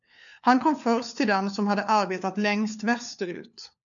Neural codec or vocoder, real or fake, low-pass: codec, 16 kHz, 4 kbps, FunCodec, trained on LibriTTS, 50 frames a second; fake; 7.2 kHz